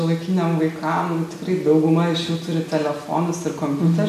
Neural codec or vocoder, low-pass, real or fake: none; 14.4 kHz; real